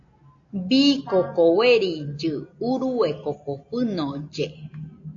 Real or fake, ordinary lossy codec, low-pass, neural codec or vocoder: real; AAC, 48 kbps; 7.2 kHz; none